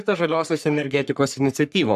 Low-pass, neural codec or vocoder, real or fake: 14.4 kHz; codec, 44.1 kHz, 3.4 kbps, Pupu-Codec; fake